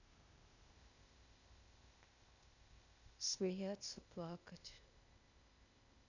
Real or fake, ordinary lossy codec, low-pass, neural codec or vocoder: fake; none; 7.2 kHz; codec, 16 kHz, 0.8 kbps, ZipCodec